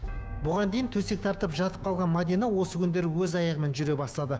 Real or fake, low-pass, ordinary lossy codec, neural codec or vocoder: fake; none; none; codec, 16 kHz, 6 kbps, DAC